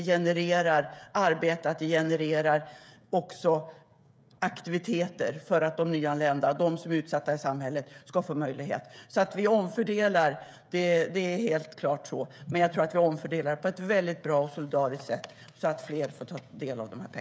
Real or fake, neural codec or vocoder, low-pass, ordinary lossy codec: fake; codec, 16 kHz, 16 kbps, FreqCodec, smaller model; none; none